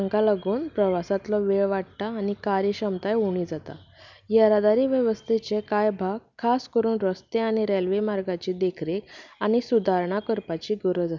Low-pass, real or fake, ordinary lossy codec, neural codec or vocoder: 7.2 kHz; real; none; none